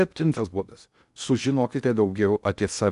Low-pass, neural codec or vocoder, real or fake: 10.8 kHz; codec, 16 kHz in and 24 kHz out, 0.6 kbps, FocalCodec, streaming, 2048 codes; fake